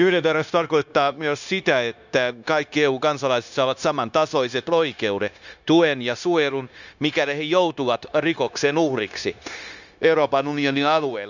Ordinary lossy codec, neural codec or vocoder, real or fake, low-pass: none; codec, 16 kHz, 0.9 kbps, LongCat-Audio-Codec; fake; 7.2 kHz